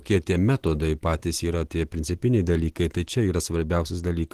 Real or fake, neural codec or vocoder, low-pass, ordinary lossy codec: real; none; 14.4 kHz; Opus, 16 kbps